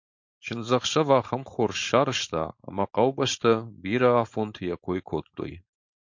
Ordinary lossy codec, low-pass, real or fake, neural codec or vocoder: MP3, 48 kbps; 7.2 kHz; fake; codec, 16 kHz, 4.8 kbps, FACodec